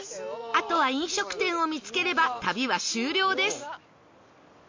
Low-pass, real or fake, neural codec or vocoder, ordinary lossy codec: 7.2 kHz; real; none; MP3, 48 kbps